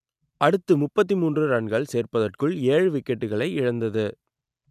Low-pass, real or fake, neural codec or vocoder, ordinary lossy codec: 14.4 kHz; real; none; none